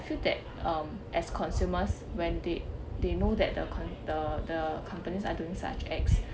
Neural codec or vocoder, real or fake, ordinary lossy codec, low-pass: none; real; none; none